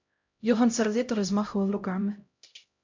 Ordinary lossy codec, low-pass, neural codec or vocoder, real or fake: MP3, 64 kbps; 7.2 kHz; codec, 16 kHz, 0.5 kbps, X-Codec, HuBERT features, trained on LibriSpeech; fake